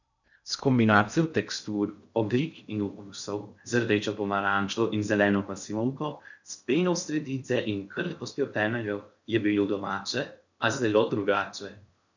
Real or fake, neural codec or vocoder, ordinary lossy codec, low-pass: fake; codec, 16 kHz in and 24 kHz out, 0.6 kbps, FocalCodec, streaming, 2048 codes; none; 7.2 kHz